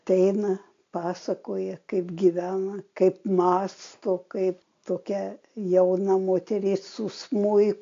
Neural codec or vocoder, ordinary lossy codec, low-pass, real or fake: none; AAC, 48 kbps; 7.2 kHz; real